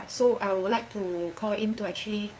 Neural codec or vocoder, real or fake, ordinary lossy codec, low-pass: codec, 16 kHz, 2 kbps, FunCodec, trained on LibriTTS, 25 frames a second; fake; none; none